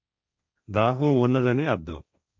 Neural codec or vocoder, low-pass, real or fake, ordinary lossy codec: codec, 16 kHz, 1.1 kbps, Voila-Tokenizer; none; fake; none